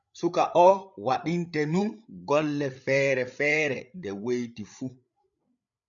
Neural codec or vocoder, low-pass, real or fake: codec, 16 kHz, 8 kbps, FreqCodec, larger model; 7.2 kHz; fake